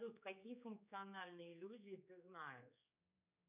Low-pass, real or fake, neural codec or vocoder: 3.6 kHz; fake; codec, 16 kHz, 4 kbps, X-Codec, HuBERT features, trained on general audio